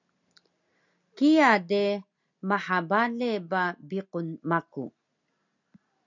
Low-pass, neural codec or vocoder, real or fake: 7.2 kHz; none; real